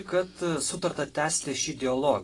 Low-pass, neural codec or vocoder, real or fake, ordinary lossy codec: 10.8 kHz; none; real; AAC, 32 kbps